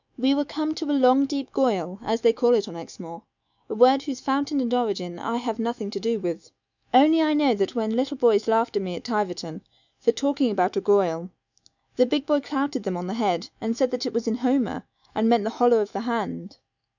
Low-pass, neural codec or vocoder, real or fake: 7.2 kHz; autoencoder, 48 kHz, 128 numbers a frame, DAC-VAE, trained on Japanese speech; fake